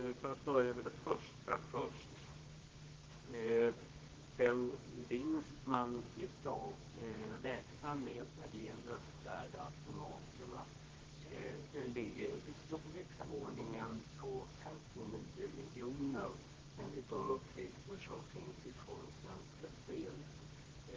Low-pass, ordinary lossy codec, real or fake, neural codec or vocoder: 7.2 kHz; Opus, 16 kbps; fake; codec, 24 kHz, 0.9 kbps, WavTokenizer, medium music audio release